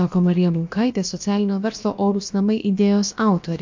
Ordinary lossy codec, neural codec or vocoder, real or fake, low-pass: MP3, 48 kbps; codec, 16 kHz, about 1 kbps, DyCAST, with the encoder's durations; fake; 7.2 kHz